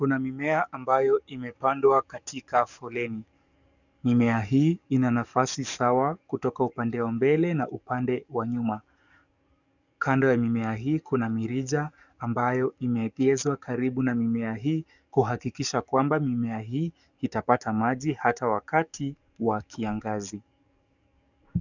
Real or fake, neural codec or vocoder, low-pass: fake; codec, 44.1 kHz, 7.8 kbps, DAC; 7.2 kHz